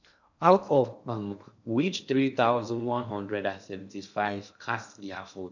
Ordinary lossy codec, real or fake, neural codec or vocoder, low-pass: none; fake; codec, 16 kHz in and 24 kHz out, 0.6 kbps, FocalCodec, streaming, 2048 codes; 7.2 kHz